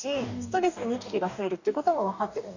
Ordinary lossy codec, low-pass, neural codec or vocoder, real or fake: none; 7.2 kHz; codec, 44.1 kHz, 2.6 kbps, DAC; fake